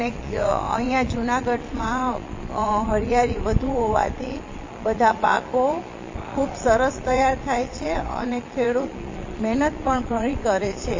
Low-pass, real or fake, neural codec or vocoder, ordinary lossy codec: 7.2 kHz; fake; vocoder, 22.05 kHz, 80 mel bands, Vocos; MP3, 32 kbps